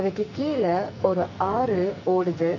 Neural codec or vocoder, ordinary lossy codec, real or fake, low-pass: codec, 44.1 kHz, 2.6 kbps, SNAC; none; fake; 7.2 kHz